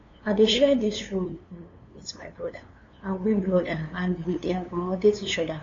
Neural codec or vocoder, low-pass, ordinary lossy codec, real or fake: codec, 16 kHz, 2 kbps, FunCodec, trained on LibriTTS, 25 frames a second; 7.2 kHz; AAC, 32 kbps; fake